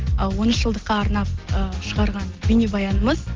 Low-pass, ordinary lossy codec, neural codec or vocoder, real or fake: 7.2 kHz; Opus, 16 kbps; none; real